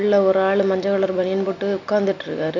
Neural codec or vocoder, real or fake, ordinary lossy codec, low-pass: none; real; none; 7.2 kHz